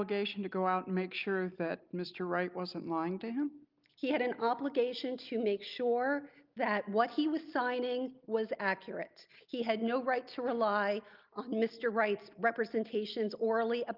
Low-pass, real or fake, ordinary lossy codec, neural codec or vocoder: 5.4 kHz; real; Opus, 24 kbps; none